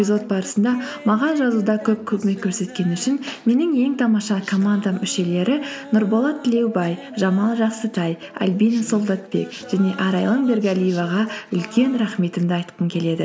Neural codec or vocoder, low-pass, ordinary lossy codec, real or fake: none; none; none; real